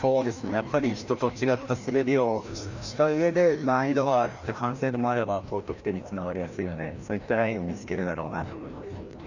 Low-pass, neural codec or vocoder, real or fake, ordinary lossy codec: 7.2 kHz; codec, 16 kHz, 1 kbps, FreqCodec, larger model; fake; none